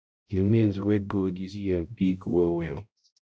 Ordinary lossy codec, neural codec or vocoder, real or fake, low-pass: none; codec, 16 kHz, 0.5 kbps, X-Codec, HuBERT features, trained on balanced general audio; fake; none